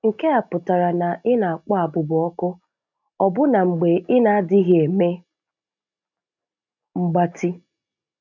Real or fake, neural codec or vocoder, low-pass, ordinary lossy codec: real; none; 7.2 kHz; none